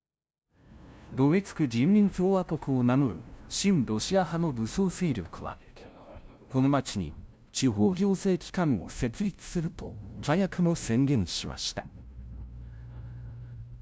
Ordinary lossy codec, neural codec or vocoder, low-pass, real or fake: none; codec, 16 kHz, 0.5 kbps, FunCodec, trained on LibriTTS, 25 frames a second; none; fake